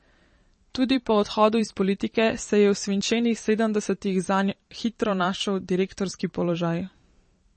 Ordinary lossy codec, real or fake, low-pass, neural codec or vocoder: MP3, 32 kbps; fake; 10.8 kHz; vocoder, 44.1 kHz, 128 mel bands every 512 samples, BigVGAN v2